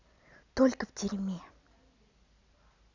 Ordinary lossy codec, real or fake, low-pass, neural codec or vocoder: none; real; 7.2 kHz; none